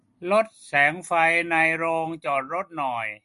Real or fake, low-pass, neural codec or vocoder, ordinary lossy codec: real; 14.4 kHz; none; MP3, 48 kbps